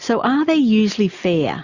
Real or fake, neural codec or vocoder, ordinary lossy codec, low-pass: real; none; Opus, 64 kbps; 7.2 kHz